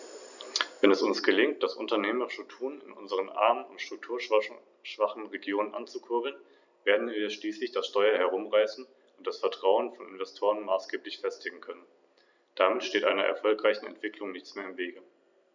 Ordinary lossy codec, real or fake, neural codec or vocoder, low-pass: none; real; none; 7.2 kHz